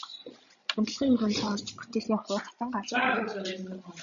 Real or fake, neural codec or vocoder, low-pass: fake; codec, 16 kHz, 16 kbps, FreqCodec, larger model; 7.2 kHz